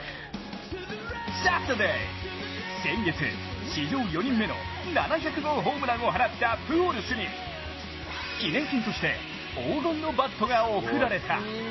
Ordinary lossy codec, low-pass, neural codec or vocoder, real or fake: MP3, 24 kbps; 7.2 kHz; none; real